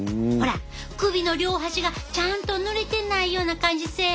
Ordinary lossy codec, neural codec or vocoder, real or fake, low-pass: none; none; real; none